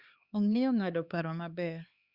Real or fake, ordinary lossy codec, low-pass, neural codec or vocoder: fake; Opus, 64 kbps; 5.4 kHz; codec, 16 kHz, 2 kbps, X-Codec, HuBERT features, trained on LibriSpeech